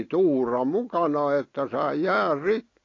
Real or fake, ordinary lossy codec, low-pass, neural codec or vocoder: real; AAC, 32 kbps; 7.2 kHz; none